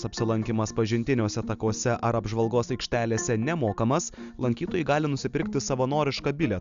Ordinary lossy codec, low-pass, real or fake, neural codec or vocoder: Opus, 64 kbps; 7.2 kHz; real; none